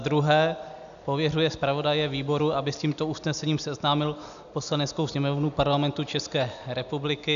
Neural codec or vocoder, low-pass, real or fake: none; 7.2 kHz; real